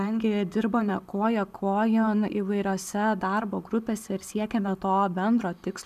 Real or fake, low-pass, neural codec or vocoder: real; 14.4 kHz; none